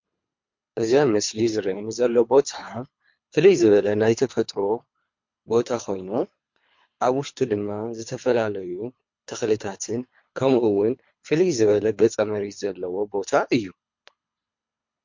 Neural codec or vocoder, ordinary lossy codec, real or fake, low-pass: codec, 24 kHz, 3 kbps, HILCodec; MP3, 48 kbps; fake; 7.2 kHz